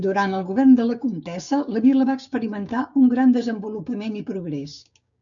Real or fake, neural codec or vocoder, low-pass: fake; codec, 16 kHz, 6 kbps, DAC; 7.2 kHz